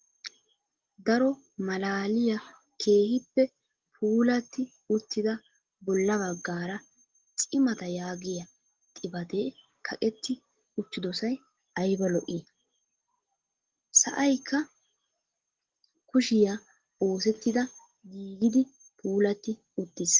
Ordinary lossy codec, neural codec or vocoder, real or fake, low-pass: Opus, 16 kbps; none; real; 7.2 kHz